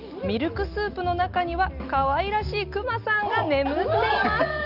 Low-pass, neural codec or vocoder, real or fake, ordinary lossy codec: 5.4 kHz; none; real; Opus, 24 kbps